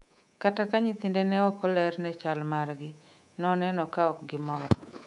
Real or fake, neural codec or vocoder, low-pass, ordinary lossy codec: fake; codec, 24 kHz, 3.1 kbps, DualCodec; 10.8 kHz; none